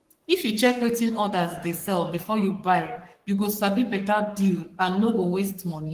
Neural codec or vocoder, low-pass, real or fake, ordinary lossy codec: codec, 44.1 kHz, 3.4 kbps, Pupu-Codec; 14.4 kHz; fake; Opus, 24 kbps